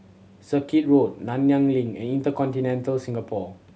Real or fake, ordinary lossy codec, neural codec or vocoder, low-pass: real; none; none; none